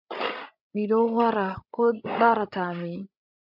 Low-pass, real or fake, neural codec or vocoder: 5.4 kHz; fake; codec, 16 kHz, 16 kbps, FreqCodec, larger model